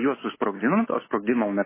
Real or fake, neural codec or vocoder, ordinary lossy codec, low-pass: real; none; MP3, 16 kbps; 3.6 kHz